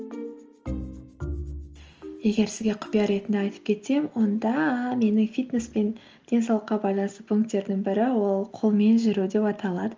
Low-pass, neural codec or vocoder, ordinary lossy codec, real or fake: 7.2 kHz; none; Opus, 24 kbps; real